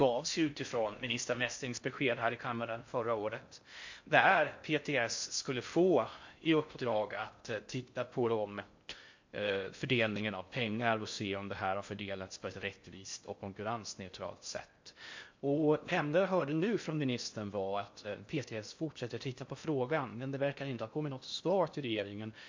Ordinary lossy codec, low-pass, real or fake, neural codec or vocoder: MP3, 48 kbps; 7.2 kHz; fake; codec, 16 kHz in and 24 kHz out, 0.6 kbps, FocalCodec, streaming, 4096 codes